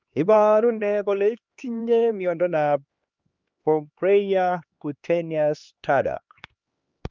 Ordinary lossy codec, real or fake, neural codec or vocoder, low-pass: Opus, 24 kbps; fake; codec, 16 kHz, 2 kbps, X-Codec, HuBERT features, trained on LibriSpeech; 7.2 kHz